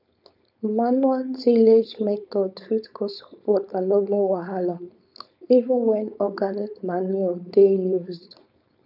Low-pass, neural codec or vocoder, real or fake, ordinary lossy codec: 5.4 kHz; codec, 16 kHz, 4.8 kbps, FACodec; fake; none